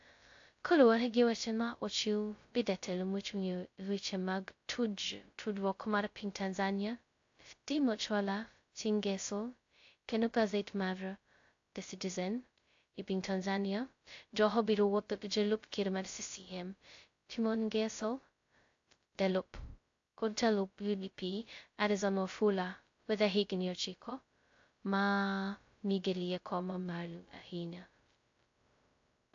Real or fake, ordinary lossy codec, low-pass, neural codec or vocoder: fake; AAC, 48 kbps; 7.2 kHz; codec, 16 kHz, 0.2 kbps, FocalCodec